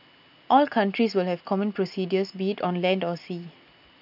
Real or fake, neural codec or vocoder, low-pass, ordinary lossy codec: real; none; 5.4 kHz; none